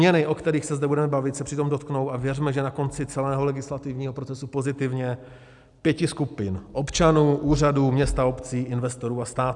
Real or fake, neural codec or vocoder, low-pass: real; none; 10.8 kHz